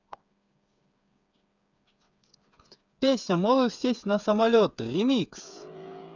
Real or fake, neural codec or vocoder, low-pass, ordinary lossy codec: fake; codec, 16 kHz, 8 kbps, FreqCodec, smaller model; 7.2 kHz; none